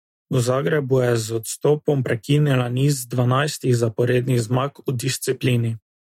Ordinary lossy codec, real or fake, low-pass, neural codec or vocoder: MP3, 64 kbps; real; 19.8 kHz; none